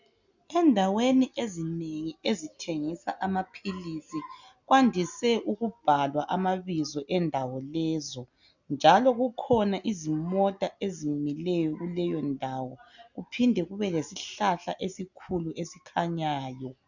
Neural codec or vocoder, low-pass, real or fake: none; 7.2 kHz; real